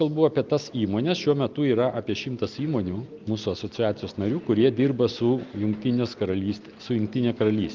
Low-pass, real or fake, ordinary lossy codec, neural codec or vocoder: 7.2 kHz; real; Opus, 24 kbps; none